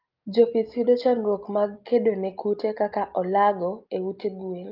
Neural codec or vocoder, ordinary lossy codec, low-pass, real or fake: none; Opus, 24 kbps; 5.4 kHz; real